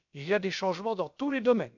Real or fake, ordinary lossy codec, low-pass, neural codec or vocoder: fake; none; 7.2 kHz; codec, 16 kHz, about 1 kbps, DyCAST, with the encoder's durations